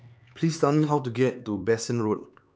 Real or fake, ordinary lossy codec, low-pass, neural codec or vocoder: fake; none; none; codec, 16 kHz, 4 kbps, X-Codec, HuBERT features, trained on LibriSpeech